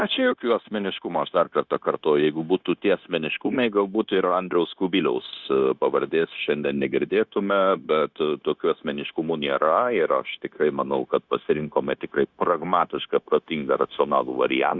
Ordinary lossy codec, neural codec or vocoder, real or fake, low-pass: Opus, 64 kbps; codec, 16 kHz, 0.9 kbps, LongCat-Audio-Codec; fake; 7.2 kHz